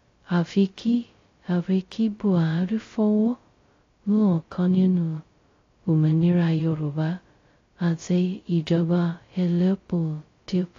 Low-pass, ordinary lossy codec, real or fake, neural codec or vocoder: 7.2 kHz; AAC, 32 kbps; fake; codec, 16 kHz, 0.2 kbps, FocalCodec